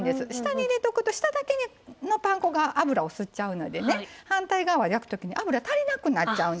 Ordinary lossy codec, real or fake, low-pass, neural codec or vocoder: none; real; none; none